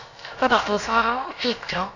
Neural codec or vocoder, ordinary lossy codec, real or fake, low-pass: codec, 16 kHz, about 1 kbps, DyCAST, with the encoder's durations; none; fake; 7.2 kHz